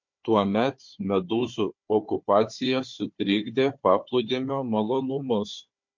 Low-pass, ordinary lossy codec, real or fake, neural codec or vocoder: 7.2 kHz; MP3, 48 kbps; fake; codec, 16 kHz, 4 kbps, FunCodec, trained on Chinese and English, 50 frames a second